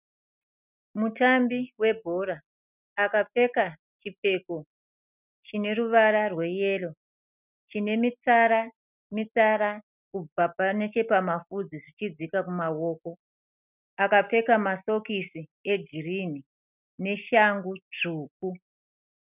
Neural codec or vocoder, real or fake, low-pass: none; real; 3.6 kHz